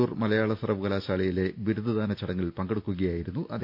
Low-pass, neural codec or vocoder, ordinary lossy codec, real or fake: 5.4 kHz; none; none; real